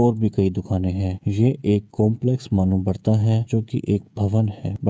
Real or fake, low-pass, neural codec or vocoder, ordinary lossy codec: fake; none; codec, 16 kHz, 16 kbps, FreqCodec, smaller model; none